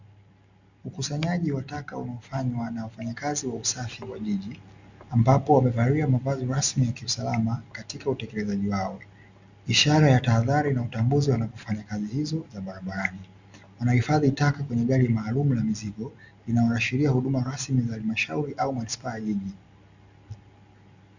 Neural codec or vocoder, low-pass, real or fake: none; 7.2 kHz; real